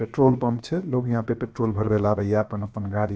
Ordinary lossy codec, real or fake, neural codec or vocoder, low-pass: none; fake; codec, 16 kHz, about 1 kbps, DyCAST, with the encoder's durations; none